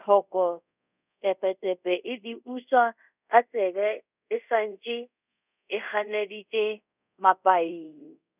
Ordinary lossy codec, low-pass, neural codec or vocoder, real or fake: none; 3.6 kHz; codec, 24 kHz, 0.5 kbps, DualCodec; fake